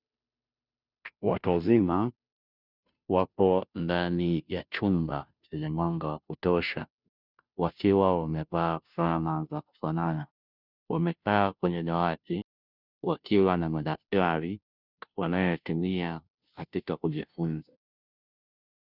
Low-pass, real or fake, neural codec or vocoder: 5.4 kHz; fake; codec, 16 kHz, 0.5 kbps, FunCodec, trained on Chinese and English, 25 frames a second